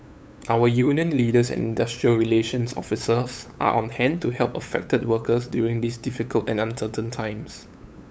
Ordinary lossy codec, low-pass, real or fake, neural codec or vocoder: none; none; fake; codec, 16 kHz, 8 kbps, FunCodec, trained on LibriTTS, 25 frames a second